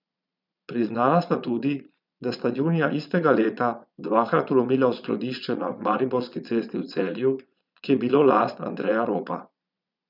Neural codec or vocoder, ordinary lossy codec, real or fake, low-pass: vocoder, 22.05 kHz, 80 mel bands, Vocos; none; fake; 5.4 kHz